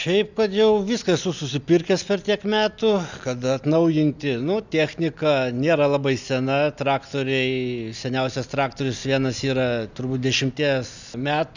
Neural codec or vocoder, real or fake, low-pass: none; real; 7.2 kHz